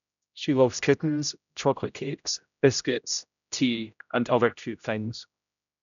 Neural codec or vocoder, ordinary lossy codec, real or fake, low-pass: codec, 16 kHz, 0.5 kbps, X-Codec, HuBERT features, trained on general audio; none; fake; 7.2 kHz